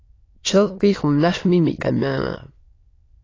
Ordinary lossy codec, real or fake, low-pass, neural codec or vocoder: AAC, 32 kbps; fake; 7.2 kHz; autoencoder, 22.05 kHz, a latent of 192 numbers a frame, VITS, trained on many speakers